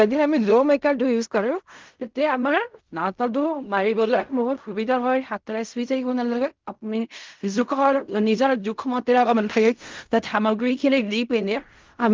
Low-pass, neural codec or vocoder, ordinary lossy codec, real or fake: 7.2 kHz; codec, 16 kHz in and 24 kHz out, 0.4 kbps, LongCat-Audio-Codec, fine tuned four codebook decoder; Opus, 32 kbps; fake